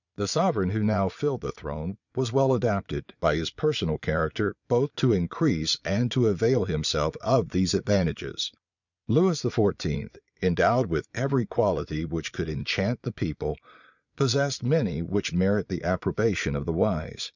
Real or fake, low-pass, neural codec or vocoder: fake; 7.2 kHz; vocoder, 22.05 kHz, 80 mel bands, Vocos